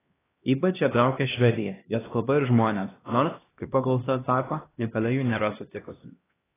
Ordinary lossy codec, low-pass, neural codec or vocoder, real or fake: AAC, 16 kbps; 3.6 kHz; codec, 16 kHz, 1 kbps, X-Codec, HuBERT features, trained on LibriSpeech; fake